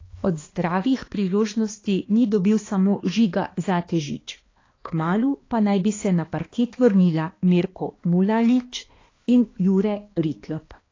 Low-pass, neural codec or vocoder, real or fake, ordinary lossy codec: 7.2 kHz; codec, 16 kHz, 2 kbps, X-Codec, HuBERT features, trained on balanced general audio; fake; AAC, 32 kbps